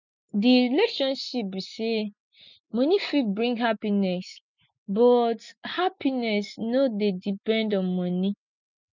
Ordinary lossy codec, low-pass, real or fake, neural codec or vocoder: none; 7.2 kHz; real; none